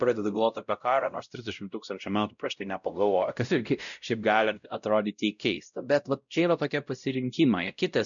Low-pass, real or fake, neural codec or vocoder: 7.2 kHz; fake; codec, 16 kHz, 0.5 kbps, X-Codec, WavLM features, trained on Multilingual LibriSpeech